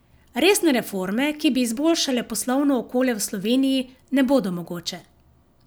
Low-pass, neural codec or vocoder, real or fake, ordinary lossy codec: none; none; real; none